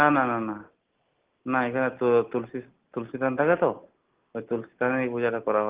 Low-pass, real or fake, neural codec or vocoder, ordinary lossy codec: 3.6 kHz; real; none; Opus, 16 kbps